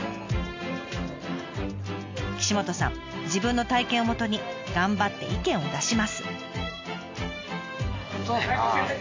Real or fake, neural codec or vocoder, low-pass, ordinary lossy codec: real; none; 7.2 kHz; AAC, 48 kbps